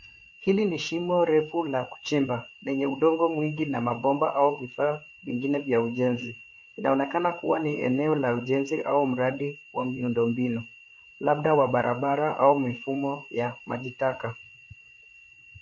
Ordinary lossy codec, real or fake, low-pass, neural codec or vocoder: MP3, 48 kbps; fake; 7.2 kHz; codec, 16 kHz, 8 kbps, FreqCodec, larger model